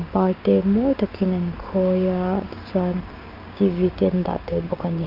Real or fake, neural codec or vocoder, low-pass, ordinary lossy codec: fake; codec, 16 kHz, 6 kbps, DAC; 5.4 kHz; Opus, 24 kbps